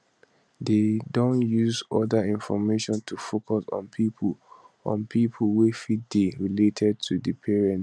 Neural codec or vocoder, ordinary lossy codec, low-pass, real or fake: none; none; none; real